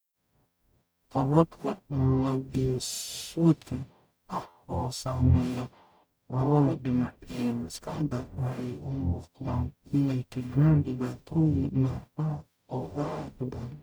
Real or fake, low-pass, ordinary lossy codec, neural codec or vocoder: fake; none; none; codec, 44.1 kHz, 0.9 kbps, DAC